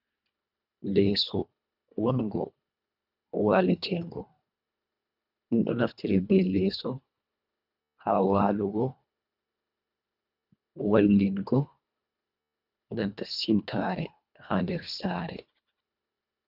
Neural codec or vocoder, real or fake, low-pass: codec, 24 kHz, 1.5 kbps, HILCodec; fake; 5.4 kHz